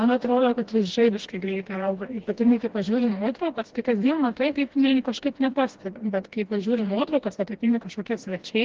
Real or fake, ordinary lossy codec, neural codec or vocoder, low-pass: fake; Opus, 16 kbps; codec, 16 kHz, 1 kbps, FreqCodec, smaller model; 7.2 kHz